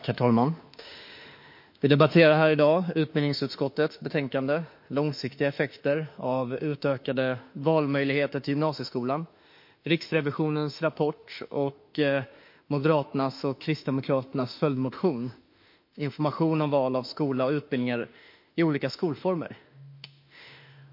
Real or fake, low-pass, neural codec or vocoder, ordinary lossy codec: fake; 5.4 kHz; autoencoder, 48 kHz, 32 numbers a frame, DAC-VAE, trained on Japanese speech; MP3, 32 kbps